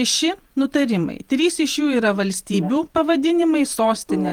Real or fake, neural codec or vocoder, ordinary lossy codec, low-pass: fake; vocoder, 48 kHz, 128 mel bands, Vocos; Opus, 24 kbps; 19.8 kHz